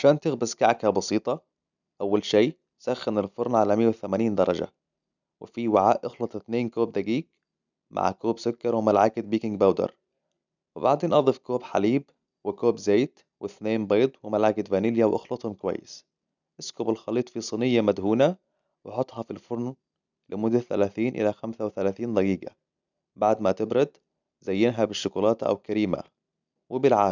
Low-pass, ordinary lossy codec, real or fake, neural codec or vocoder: 7.2 kHz; none; real; none